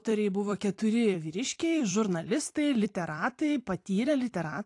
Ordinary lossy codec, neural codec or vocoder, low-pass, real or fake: AAC, 48 kbps; vocoder, 44.1 kHz, 128 mel bands every 256 samples, BigVGAN v2; 10.8 kHz; fake